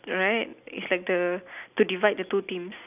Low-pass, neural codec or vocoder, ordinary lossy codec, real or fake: 3.6 kHz; none; none; real